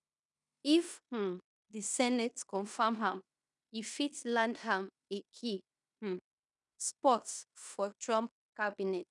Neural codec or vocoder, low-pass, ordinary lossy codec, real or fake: codec, 16 kHz in and 24 kHz out, 0.9 kbps, LongCat-Audio-Codec, fine tuned four codebook decoder; 10.8 kHz; none; fake